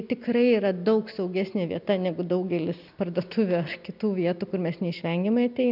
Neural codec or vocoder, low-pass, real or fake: none; 5.4 kHz; real